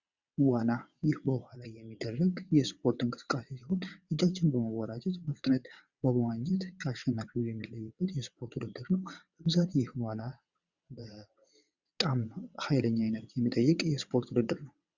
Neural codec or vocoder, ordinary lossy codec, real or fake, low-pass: vocoder, 22.05 kHz, 80 mel bands, WaveNeXt; Opus, 64 kbps; fake; 7.2 kHz